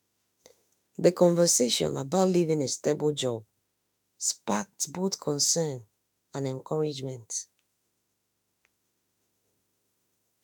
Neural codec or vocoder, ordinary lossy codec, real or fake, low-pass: autoencoder, 48 kHz, 32 numbers a frame, DAC-VAE, trained on Japanese speech; none; fake; none